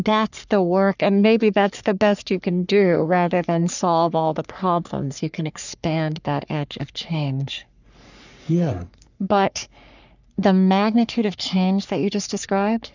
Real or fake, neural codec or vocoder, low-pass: fake; codec, 44.1 kHz, 3.4 kbps, Pupu-Codec; 7.2 kHz